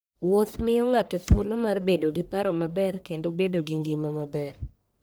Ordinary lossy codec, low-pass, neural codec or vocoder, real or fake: none; none; codec, 44.1 kHz, 1.7 kbps, Pupu-Codec; fake